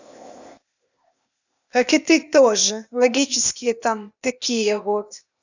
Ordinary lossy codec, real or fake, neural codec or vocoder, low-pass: none; fake; codec, 16 kHz, 0.8 kbps, ZipCodec; 7.2 kHz